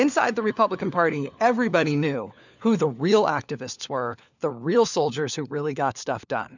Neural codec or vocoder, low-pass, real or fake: codec, 16 kHz, 4 kbps, FunCodec, trained on LibriTTS, 50 frames a second; 7.2 kHz; fake